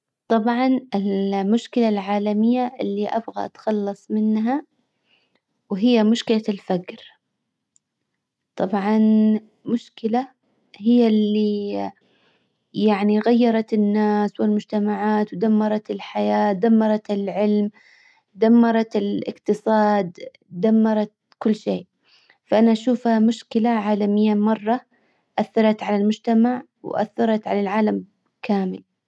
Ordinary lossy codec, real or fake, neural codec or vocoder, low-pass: none; real; none; none